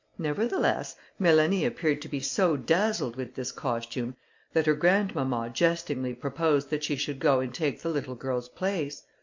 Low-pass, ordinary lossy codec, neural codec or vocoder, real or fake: 7.2 kHz; MP3, 64 kbps; none; real